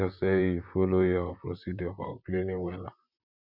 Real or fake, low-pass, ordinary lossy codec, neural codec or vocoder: fake; 5.4 kHz; none; vocoder, 44.1 kHz, 128 mel bands, Pupu-Vocoder